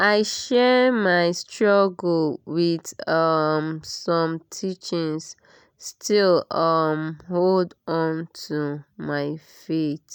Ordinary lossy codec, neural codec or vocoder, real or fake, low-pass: none; none; real; none